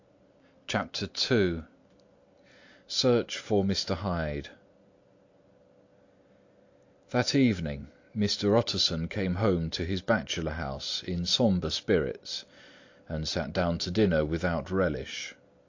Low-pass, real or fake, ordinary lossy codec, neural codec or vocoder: 7.2 kHz; real; AAC, 48 kbps; none